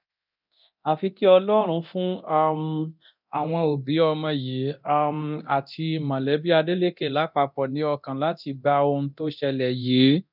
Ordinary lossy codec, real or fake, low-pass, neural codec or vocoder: none; fake; 5.4 kHz; codec, 24 kHz, 0.9 kbps, DualCodec